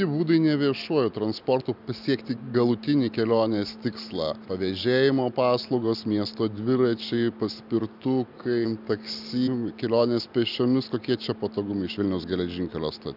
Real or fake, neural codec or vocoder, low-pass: real; none; 5.4 kHz